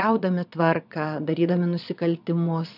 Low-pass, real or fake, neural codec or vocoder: 5.4 kHz; fake; vocoder, 22.05 kHz, 80 mel bands, Vocos